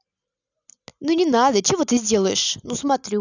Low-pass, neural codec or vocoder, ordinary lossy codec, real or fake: 7.2 kHz; none; none; real